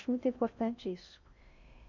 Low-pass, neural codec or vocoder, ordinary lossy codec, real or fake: 7.2 kHz; codec, 16 kHz in and 24 kHz out, 0.6 kbps, FocalCodec, streaming, 4096 codes; none; fake